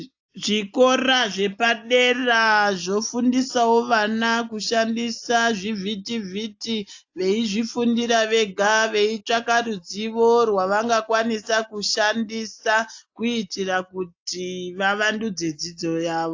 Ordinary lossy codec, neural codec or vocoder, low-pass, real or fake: AAC, 48 kbps; none; 7.2 kHz; real